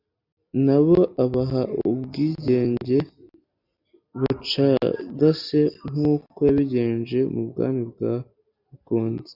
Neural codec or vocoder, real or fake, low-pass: none; real; 5.4 kHz